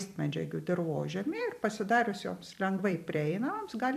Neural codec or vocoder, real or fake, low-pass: vocoder, 44.1 kHz, 128 mel bands every 256 samples, BigVGAN v2; fake; 14.4 kHz